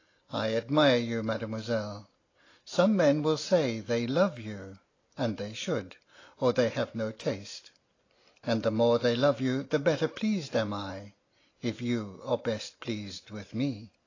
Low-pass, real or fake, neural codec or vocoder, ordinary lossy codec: 7.2 kHz; real; none; AAC, 32 kbps